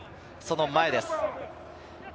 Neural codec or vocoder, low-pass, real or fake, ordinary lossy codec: none; none; real; none